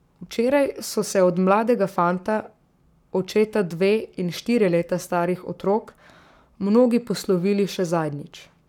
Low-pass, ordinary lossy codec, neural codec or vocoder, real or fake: 19.8 kHz; none; codec, 44.1 kHz, 7.8 kbps, Pupu-Codec; fake